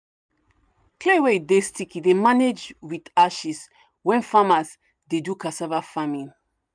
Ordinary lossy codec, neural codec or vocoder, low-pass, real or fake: none; none; 9.9 kHz; real